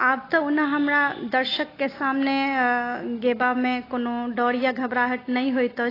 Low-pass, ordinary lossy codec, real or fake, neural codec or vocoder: 5.4 kHz; AAC, 24 kbps; real; none